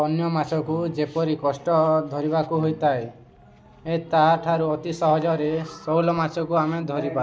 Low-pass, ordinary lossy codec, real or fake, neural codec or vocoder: none; none; real; none